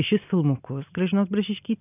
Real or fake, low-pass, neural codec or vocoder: real; 3.6 kHz; none